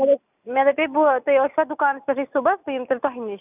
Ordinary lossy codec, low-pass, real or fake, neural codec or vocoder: none; 3.6 kHz; real; none